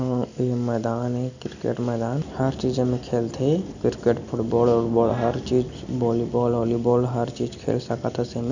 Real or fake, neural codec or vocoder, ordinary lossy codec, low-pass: real; none; none; 7.2 kHz